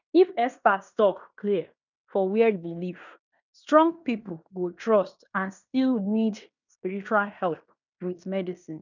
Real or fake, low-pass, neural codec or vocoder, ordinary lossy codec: fake; 7.2 kHz; codec, 16 kHz in and 24 kHz out, 0.9 kbps, LongCat-Audio-Codec, fine tuned four codebook decoder; none